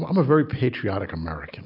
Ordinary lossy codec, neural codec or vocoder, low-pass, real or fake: AAC, 48 kbps; none; 5.4 kHz; real